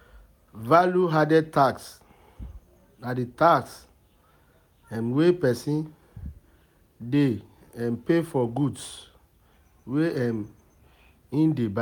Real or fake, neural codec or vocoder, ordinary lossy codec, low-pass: real; none; none; 19.8 kHz